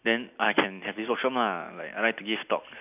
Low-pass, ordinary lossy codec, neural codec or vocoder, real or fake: 3.6 kHz; none; none; real